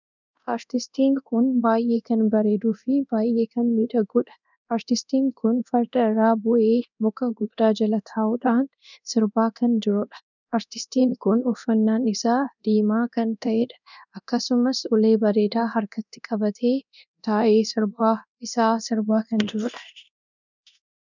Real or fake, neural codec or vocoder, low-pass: fake; codec, 24 kHz, 0.9 kbps, DualCodec; 7.2 kHz